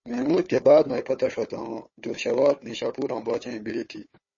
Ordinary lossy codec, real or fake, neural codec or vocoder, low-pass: MP3, 32 kbps; fake; codec, 16 kHz, 4 kbps, FunCodec, trained on Chinese and English, 50 frames a second; 7.2 kHz